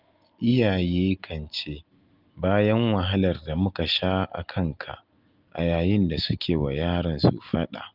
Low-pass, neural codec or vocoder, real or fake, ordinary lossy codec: 5.4 kHz; none; real; Opus, 32 kbps